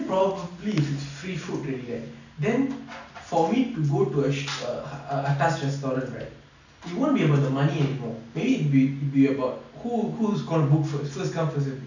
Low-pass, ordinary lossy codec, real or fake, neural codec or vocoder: 7.2 kHz; none; real; none